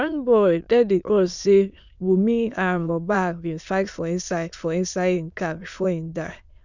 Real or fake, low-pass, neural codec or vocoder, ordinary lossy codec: fake; 7.2 kHz; autoencoder, 22.05 kHz, a latent of 192 numbers a frame, VITS, trained on many speakers; none